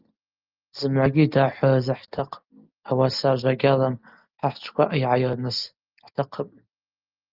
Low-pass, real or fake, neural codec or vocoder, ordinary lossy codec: 5.4 kHz; real; none; Opus, 32 kbps